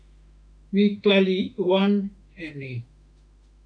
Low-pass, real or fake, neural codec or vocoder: 9.9 kHz; fake; autoencoder, 48 kHz, 32 numbers a frame, DAC-VAE, trained on Japanese speech